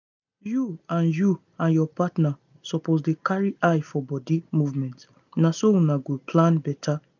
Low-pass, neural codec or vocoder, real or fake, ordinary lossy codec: 7.2 kHz; none; real; none